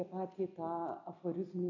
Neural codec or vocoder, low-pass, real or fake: none; 7.2 kHz; real